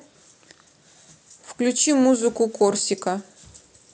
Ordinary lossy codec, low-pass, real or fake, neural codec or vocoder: none; none; real; none